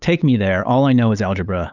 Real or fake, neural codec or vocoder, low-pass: fake; codec, 16 kHz, 8 kbps, FunCodec, trained on LibriTTS, 25 frames a second; 7.2 kHz